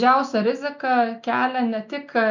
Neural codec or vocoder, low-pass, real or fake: none; 7.2 kHz; real